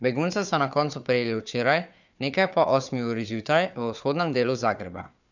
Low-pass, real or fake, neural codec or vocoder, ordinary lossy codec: 7.2 kHz; fake; codec, 16 kHz, 4 kbps, FunCodec, trained on Chinese and English, 50 frames a second; none